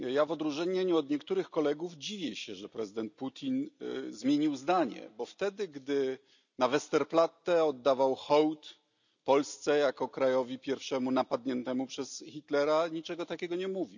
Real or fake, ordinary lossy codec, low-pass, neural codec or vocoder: real; none; 7.2 kHz; none